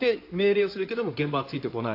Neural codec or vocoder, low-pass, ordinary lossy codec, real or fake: codec, 16 kHz in and 24 kHz out, 2.2 kbps, FireRedTTS-2 codec; 5.4 kHz; AAC, 32 kbps; fake